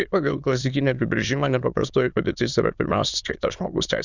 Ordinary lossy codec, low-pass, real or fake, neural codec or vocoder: Opus, 64 kbps; 7.2 kHz; fake; autoencoder, 22.05 kHz, a latent of 192 numbers a frame, VITS, trained on many speakers